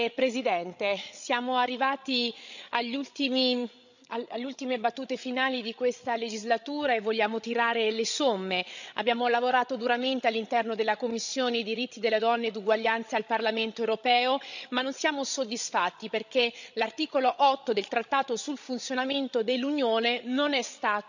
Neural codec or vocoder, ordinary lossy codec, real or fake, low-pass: codec, 16 kHz, 16 kbps, FreqCodec, larger model; none; fake; 7.2 kHz